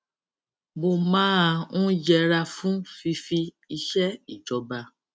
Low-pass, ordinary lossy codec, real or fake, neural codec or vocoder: none; none; real; none